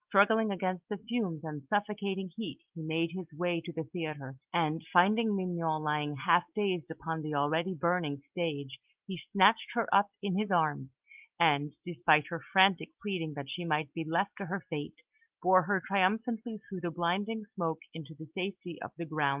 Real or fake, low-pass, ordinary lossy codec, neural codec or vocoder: real; 3.6 kHz; Opus, 32 kbps; none